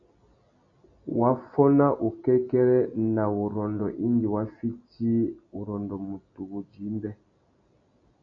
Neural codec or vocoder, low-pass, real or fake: none; 7.2 kHz; real